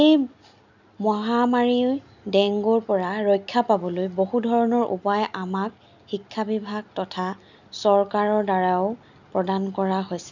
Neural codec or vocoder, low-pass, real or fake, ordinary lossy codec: none; 7.2 kHz; real; none